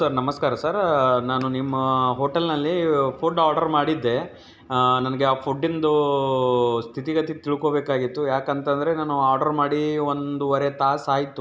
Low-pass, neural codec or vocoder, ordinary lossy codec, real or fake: none; none; none; real